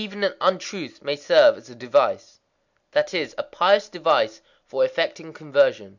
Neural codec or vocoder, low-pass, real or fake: none; 7.2 kHz; real